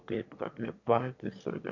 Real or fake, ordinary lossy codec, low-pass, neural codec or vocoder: fake; MP3, 48 kbps; 7.2 kHz; autoencoder, 22.05 kHz, a latent of 192 numbers a frame, VITS, trained on one speaker